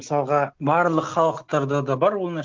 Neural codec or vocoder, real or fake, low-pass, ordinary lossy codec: none; real; 7.2 kHz; Opus, 24 kbps